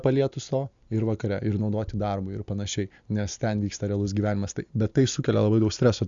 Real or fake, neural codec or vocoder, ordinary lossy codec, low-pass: real; none; Opus, 64 kbps; 7.2 kHz